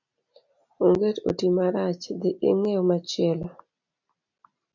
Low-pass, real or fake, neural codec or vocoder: 7.2 kHz; real; none